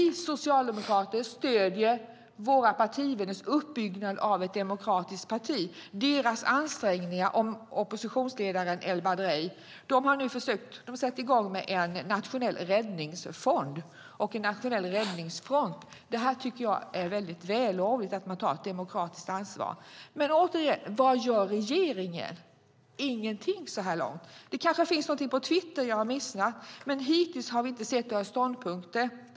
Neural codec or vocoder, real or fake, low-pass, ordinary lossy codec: none; real; none; none